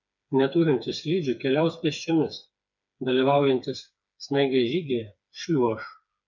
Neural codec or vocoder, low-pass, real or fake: codec, 16 kHz, 4 kbps, FreqCodec, smaller model; 7.2 kHz; fake